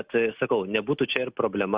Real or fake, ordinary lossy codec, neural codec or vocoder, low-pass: real; Opus, 64 kbps; none; 3.6 kHz